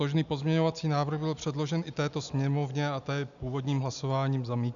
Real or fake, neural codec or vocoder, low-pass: real; none; 7.2 kHz